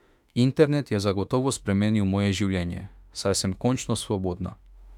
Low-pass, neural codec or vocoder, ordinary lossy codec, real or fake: 19.8 kHz; autoencoder, 48 kHz, 32 numbers a frame, DAC-VAE, trained on Japanese speech; none; fake